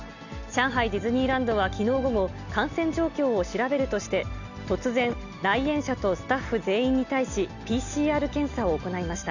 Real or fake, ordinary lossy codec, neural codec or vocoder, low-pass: real; none; none; 7.2 kHz